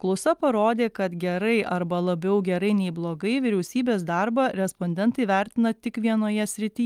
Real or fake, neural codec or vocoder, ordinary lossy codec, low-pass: real; none; Opus, 32 kbps; 19.8 kHz